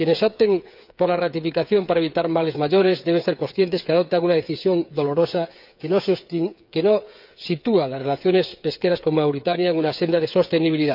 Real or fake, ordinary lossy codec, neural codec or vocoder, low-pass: fake; none; codec, 16 kHz, 8 kbps, FreqCodec, smaller model; 5.4 kHz